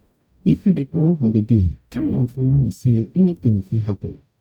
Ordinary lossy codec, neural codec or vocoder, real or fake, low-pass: none; codec, 44.1 kHz, 0.9 kbps, DAC; fake; 19.8 kHz